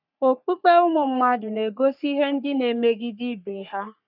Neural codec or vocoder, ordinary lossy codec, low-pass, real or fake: codec, 44.1 kHz, 3.4 kbps, Pupu-Codec; none; 5.4 kHz; fake